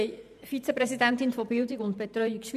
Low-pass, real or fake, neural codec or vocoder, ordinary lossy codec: 14.4 kHz; fake; vocoder, 44.1 kHz, 128 mel bands every 512 samples, BigVGAN v2; none